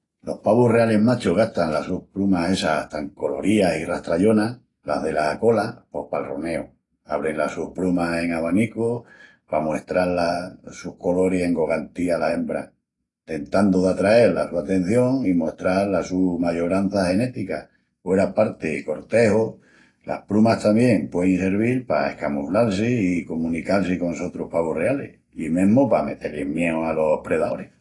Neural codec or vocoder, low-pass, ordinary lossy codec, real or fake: none; 10.8 kHz; AAC, 32 kbps; real